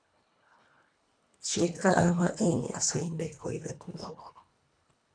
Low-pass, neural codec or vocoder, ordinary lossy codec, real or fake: 9.9 kHz; codec, 24 kHz, 1.5 kbps, HILCodec; AAC, 64 kbps; fake